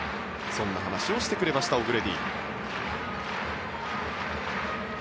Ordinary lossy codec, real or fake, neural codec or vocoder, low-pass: none; real; none; none